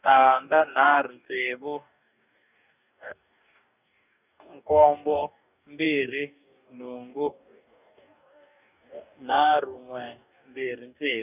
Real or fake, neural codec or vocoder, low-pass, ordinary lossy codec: fake; codec, 44.1 kHz, 2.6 kbps, DAC; 3.6 kHz; none